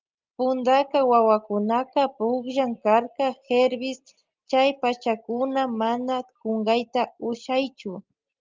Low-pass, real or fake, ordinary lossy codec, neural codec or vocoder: 7.2 kHz; real; Opus, 32 kbps; none